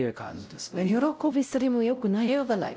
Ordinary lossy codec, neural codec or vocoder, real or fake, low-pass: none; codec, 16 kHz, 0.5 kbps, X-Codec, WavLM features, trained on Multilingual LibriSpeech; fake; none